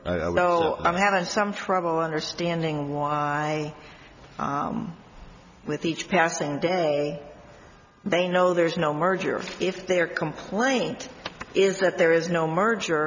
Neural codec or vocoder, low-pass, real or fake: none; 7.2 kHz; real